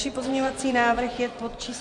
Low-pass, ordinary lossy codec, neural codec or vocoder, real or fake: 10.8 kHz; MP3, 64 kbps; vocoder, 24 kHz, 100 mel bands, Vocos; fake